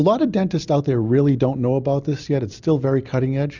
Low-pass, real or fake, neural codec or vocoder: 7.2 kHz; real; none